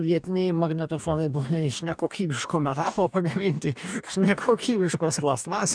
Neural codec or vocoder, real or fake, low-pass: codec, 44.1 kHz, 2.6 kbps, DAC; fake; 9.9 kHz